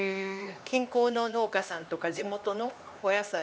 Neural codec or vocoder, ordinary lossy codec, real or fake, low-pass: codec, 16 kHz, 2 kbps, X-Codec, HuBERT features, trained on LibriSpeech; none; fake; none